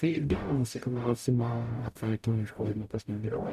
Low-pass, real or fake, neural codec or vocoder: 14.4 kHz; fake; codec, 44.1 kHz, 0.9 kbps, DAC